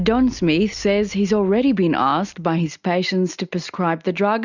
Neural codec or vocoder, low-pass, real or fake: none; 7.2 kHz; real